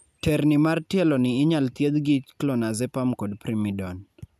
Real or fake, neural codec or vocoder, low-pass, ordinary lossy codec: real; none; 14.4 kHz; none